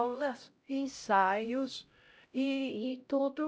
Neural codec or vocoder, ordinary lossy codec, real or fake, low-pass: codec, 16 kHz, 0.5 kbps, X-Codec, HuBERT features, trained on LibriSpeech; none; fake; none